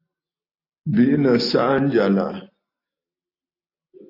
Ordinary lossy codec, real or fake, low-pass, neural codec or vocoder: AAC, 32 kbps; real; 5.4 kHz; none